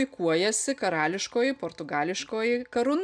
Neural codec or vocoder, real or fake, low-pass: none; real; 9.9 kHz